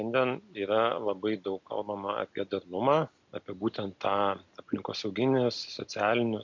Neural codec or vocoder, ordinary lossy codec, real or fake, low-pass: none; MP3, 64 kbps; real; 7.2 kHz